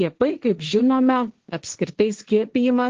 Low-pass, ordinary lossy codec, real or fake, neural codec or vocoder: 7.2 kHz; Opus, 16 kbps; fake; codec, 16 kHz, 1.1 kbps, Voila-Tokenizer